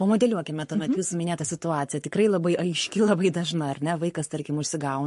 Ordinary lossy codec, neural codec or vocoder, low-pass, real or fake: MP3, 48 kbps; codec, 44.1 kHz, 7.8 kbps, Pupu-Codec; 14.4 kHz; fake